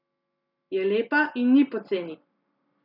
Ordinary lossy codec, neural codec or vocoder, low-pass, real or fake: none; none; 5.4 kHz; real